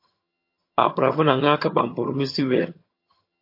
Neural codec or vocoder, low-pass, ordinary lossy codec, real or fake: vocoder, 22.05 kHz, 80 mel bands, HiFi-GAN; 5.4 kHz; MP3, 32 kbps; fake